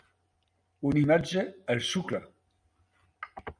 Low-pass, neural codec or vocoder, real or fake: 9.9 kHz; none; real